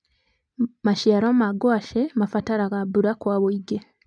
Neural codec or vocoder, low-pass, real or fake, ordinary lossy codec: none; 9.9 kHz; real; none